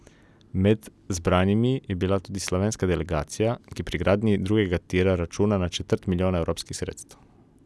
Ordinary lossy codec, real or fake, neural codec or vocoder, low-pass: none; real; none; none